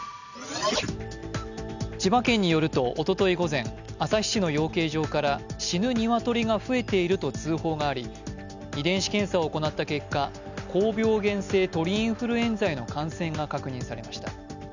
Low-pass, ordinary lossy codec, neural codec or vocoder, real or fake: 7.2 kHz; none; none; real